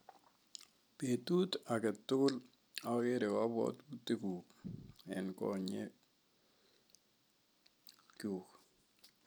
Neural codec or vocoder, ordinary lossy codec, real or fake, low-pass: none; none; real; none